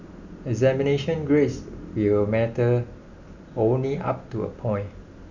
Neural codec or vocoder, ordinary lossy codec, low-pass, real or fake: none; none; 7.2 kHz; real